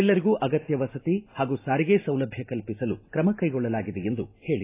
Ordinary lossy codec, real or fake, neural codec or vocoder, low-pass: AAC, 24 kbps; real; none; 3.6 kHz